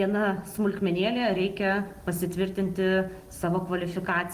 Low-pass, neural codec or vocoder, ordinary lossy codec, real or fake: 14.4 kHz; none; Opus, 24 kbps; real